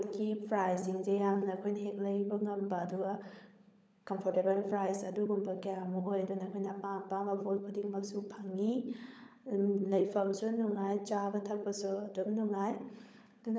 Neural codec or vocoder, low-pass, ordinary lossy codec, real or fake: codec, 16 kHz, 16 kbps, FunCodec, trained on LibriTTS, 50 frames a second; none; none; fake